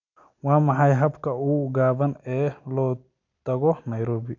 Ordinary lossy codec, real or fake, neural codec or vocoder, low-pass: none; real; none; 7.2 kHz